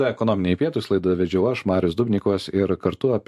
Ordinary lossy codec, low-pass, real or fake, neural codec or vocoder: MP3, 64 kbps; 14.4 kHz; fake; vocoder, 44.1 kHz, 128 mel bands every 512 samples, BigVGAN v2